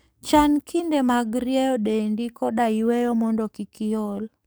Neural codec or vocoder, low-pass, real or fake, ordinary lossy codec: codec, 44.1 kHz, 7.8 kbps, DAC; none; fake; none